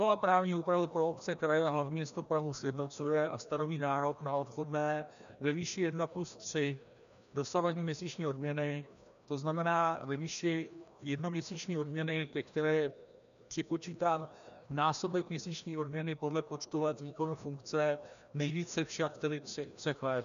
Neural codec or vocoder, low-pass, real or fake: codec, 16 kHz, 1 kbps, FreqCodec, larger model; 7.2 kHz; fake